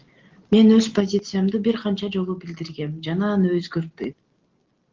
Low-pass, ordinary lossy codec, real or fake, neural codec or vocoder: 7.2 kHz; Opus, 16 kbps; real; none